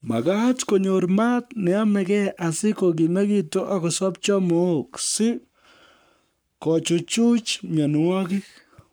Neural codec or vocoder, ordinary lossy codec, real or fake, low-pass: codec, 44.1 kHz, 7.8 kbps, Pupu-Codec; none; fake; none